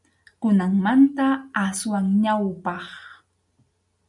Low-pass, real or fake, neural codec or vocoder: 10.8 kHz; real; none